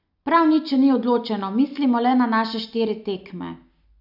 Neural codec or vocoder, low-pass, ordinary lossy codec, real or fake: none; 5.4 kHz; none; real